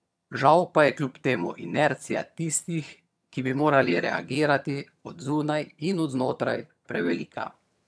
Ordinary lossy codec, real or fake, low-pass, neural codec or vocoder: none; fake; none; vocoder, 22.05 kHz, 80 mel bands, HiFi-GAN